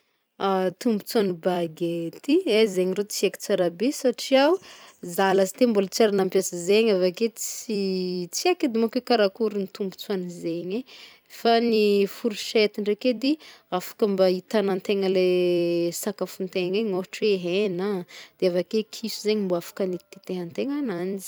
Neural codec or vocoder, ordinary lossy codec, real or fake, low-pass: vocoder, 44.1 kHz, 128 mel bands every 256 samples, BigVGAN v2; none; fake; none